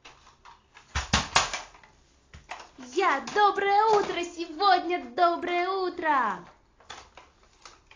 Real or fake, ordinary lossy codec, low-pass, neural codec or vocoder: real; AAC, 32 kbps; 7.2 kHz; none